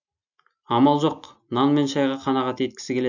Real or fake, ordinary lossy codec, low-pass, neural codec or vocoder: real; none; 7.2 kHz; none